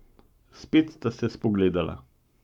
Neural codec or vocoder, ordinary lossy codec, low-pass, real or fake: codec, 44.1 kHz, 7.8 kbps, Pupu-Codec; none; 19.8 kHz; fake